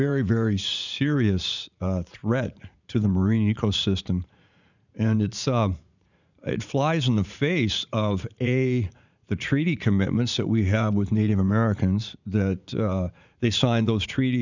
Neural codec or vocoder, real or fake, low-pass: vocoder, 44.1 kHz, 80 mel bands, Vocos; fake; 7.2 kHz